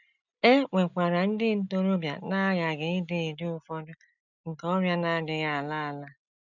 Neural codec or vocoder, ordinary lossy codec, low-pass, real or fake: none; none; 7.2 kHz; real